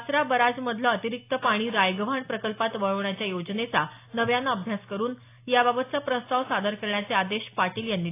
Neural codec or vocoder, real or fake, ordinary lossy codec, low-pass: none; real; AAC, 24 kbps; 3.6 kHz